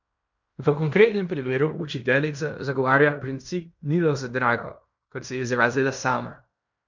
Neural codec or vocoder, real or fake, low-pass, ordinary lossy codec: codec, 16 kHz in and 24 kHz out, 0.9 kbps, LongCat-Audio-Codec, fine tuned four codebook decoder; fake; 7.2 kHz; none